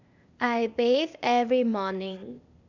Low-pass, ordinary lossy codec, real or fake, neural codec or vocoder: 7.2 kHz; none; fake; codec, 16 kHz, 0.8 kbps, ZipCodec